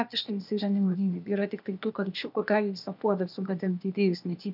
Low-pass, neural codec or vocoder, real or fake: 5.4 kHz; codec, 16 kHz, 0.8 kbps, ZipCodec; fake